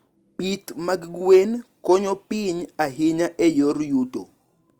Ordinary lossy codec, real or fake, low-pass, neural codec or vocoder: Opus, 32 kbps; real; 19.8 kHz; none